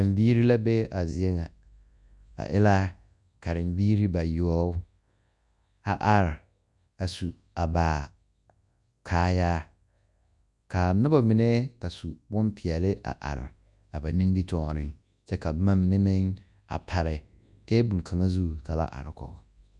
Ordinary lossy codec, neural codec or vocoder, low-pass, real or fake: Opus, 64 kbps; codec, 24 kHz, 0.9 kbps, WavTokenizer, large speech release; 10.8 kHz; fake